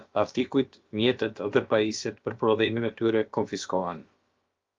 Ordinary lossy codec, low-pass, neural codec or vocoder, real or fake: Opus, 32 kbps; 7.2 kHz; codec, 16 kHz, about 1 kbps, DyCAST, with the encoder's durations; fake